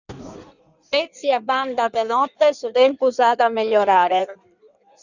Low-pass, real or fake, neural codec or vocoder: 7.2 kHz; fake; codec, 16 kHz in and 24 kHz out, 1.1 kbps, FireRedTTS-2 codec